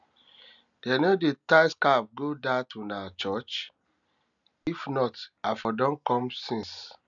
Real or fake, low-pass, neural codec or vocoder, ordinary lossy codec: real; 7.2 kHz; none; none